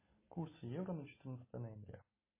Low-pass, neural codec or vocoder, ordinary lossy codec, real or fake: 3.6 kHz; none; MP3, 16 kbps; real